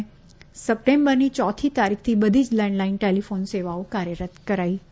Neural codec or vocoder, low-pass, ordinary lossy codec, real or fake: none; none; none; real